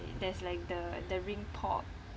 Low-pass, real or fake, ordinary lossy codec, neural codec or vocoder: none; real; none; none